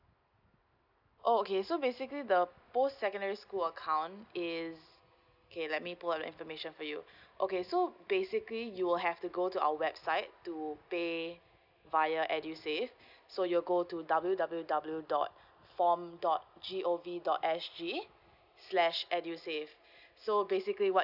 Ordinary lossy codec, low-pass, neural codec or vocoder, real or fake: none; 5.4 kHz; none; real